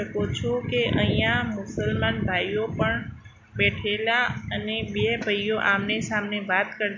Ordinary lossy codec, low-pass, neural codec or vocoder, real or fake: MP3, 48 kbps; 7.2 kHz; none; real